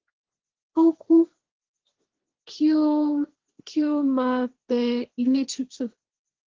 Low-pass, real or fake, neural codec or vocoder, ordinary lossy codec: 7.2 kHz; fake; codec, 16 kHz, 1.1 kbps, Voila-Tokenizer; Opus, 16 kbps